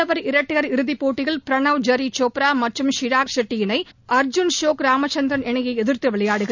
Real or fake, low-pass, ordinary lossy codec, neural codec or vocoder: real; 7.2 kHz; none; none